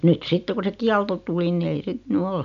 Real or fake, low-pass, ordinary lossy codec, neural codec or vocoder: real; 7.2 kHz; none; none